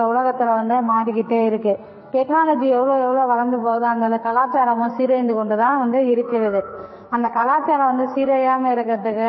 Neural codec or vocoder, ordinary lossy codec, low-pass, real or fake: codec, 44.1 kHz, 2.6 kbps, SNAC; MP3, 24 kbps; 7.2 kHz; fake